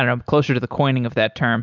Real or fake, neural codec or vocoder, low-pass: real; none; 7.2 kHz